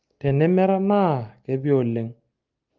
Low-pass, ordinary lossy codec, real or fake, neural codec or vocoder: 7.2 kHz; Opus, 24 kbps; real; none